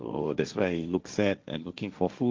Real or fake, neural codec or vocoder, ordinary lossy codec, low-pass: fake; codec, 16 kHz, 1.1 kbps, Voila-Tokenizer; Opus, 24 kbps; 7.2 kHz